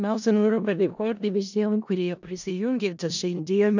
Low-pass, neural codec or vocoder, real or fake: 7.2 kHz; codec, 16 kHz in and 24 kHz out, 0.4 kbps, LongCat-Audio-Codec, four codebook decoder; fake